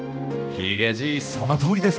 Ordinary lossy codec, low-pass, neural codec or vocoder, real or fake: none; none; codec, 16 kHz, 1 kbps, X-Codec, HuBERT features, trained on balanced general audio; fake